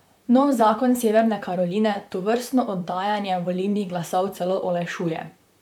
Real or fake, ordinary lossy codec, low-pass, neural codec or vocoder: fake; none; 19.8 kHz; vocoder, 44.1 kHz, 128 mel bands, Pupu-Vocoder